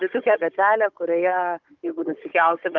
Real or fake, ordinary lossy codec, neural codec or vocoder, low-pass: fake; Opus, 32 kbps; codec, 16 kHz, 16 kbps, FunCodec, trained on Chinese and English, 50 frames a second; 7.2 kHz